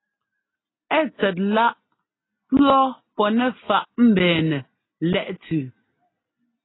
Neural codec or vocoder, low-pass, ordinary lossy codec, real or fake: none; 7.2 kHz; AAC, 16 kbps; real